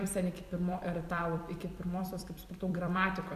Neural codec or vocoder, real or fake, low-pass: vocoder, 44.1 kHz, 128 mel bands every 256 samples, BigVGAN v2; fake; 14.4 kHz